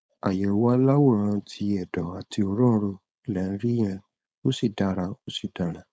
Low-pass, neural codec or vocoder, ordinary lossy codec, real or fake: none; codec, 16 kHz, 4.8 kbps, FACodec; none; fake